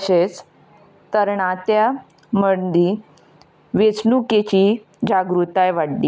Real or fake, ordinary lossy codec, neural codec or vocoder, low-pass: real; none; none; none